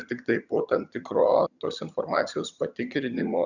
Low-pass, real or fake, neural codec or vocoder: 7.2 kHz; fake; vocoder, 22.05 kHz, 80 mel bands, HiFi-GAN